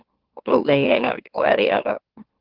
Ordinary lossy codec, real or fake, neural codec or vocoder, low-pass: Opus, 16 kbps; fake; autoencoder, 44.1 kHz, a latent of 192 numbers a frame, MeloTTS; 5.4 kHz